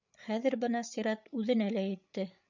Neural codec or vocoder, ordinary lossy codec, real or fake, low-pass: codec, 16 kHz, 8 kbps, FreqCodec, larger model; MP3, 64 kbps; fake; 7.2 kHz